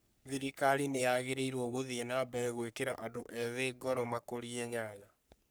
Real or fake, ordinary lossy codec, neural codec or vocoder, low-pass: fake; none; codec, 44.1 kHz, 3.4 kbps, Pupu-Codec; none